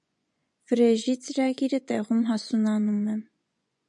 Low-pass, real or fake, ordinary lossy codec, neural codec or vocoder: 10.8 kHz; fake; MP3, 96 kbps; vocoder, 24 kHz, 100 mel bands, Vocos